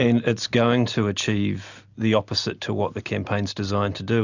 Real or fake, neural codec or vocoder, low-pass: real; none; 7.2 kHz